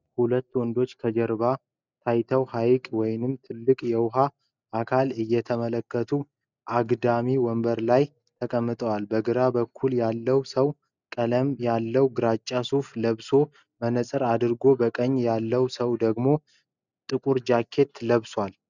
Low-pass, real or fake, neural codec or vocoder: 7.2 kHz; real; none